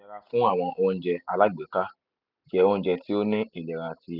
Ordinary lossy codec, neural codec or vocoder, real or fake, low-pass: none; none; real; 5.4 kHz